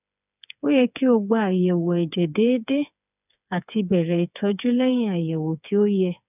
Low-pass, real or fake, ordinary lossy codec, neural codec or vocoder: 3.6 kHz; fake; none; codec, 16 kHz, 4 kbps, FreqCodec, smaller model